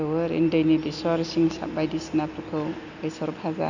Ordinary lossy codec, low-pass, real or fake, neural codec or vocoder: none; 7.2 kHz; real; none